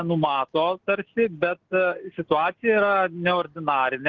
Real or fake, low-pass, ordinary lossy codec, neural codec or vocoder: real; 7.2 kHz; Opus, 16 kbps; none